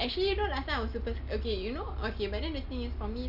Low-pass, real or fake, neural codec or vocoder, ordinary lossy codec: 5.4 kHz; real; none; AAC, 48 kbps